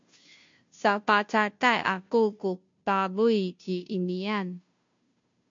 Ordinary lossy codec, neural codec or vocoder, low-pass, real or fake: MP3, 48 kbps; codec, 16 kHz, 0.5 kbps, FunCodec, trained on Chinese and English, 25 frames a second; 7.2 kHz; fake